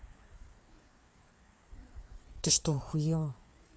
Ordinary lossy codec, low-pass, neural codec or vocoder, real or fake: none; none; codec, 16 kHz, 4 kbps, FreqCodec, larger model; fake